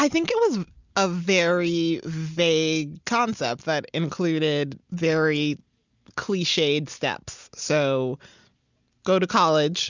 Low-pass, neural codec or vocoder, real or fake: 7.2 kHz; none; real